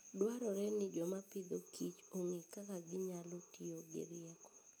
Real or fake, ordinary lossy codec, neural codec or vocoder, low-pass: real; none; none; none